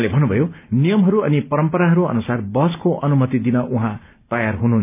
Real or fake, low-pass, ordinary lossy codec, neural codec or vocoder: real; 3.6 kHz; none; none